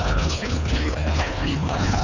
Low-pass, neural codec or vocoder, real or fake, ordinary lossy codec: 7.2 kHz; codec, 24 kHz, 1.5 kbps, HILCodec; fake; none